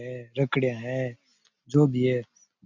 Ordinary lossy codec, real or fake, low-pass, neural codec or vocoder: none; real; 7.2 kHz; none